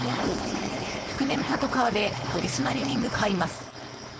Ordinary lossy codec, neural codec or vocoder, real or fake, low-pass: none; codec, 16 kHz, 4.8 kbps, FACodec; fake; none